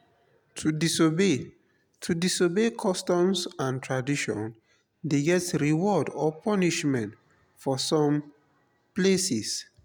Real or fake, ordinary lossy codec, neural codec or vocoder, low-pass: real; none; none; none